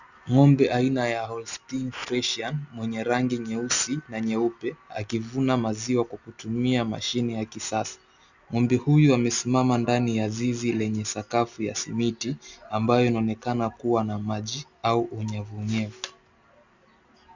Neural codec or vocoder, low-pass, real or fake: none; 7.2 kHz; real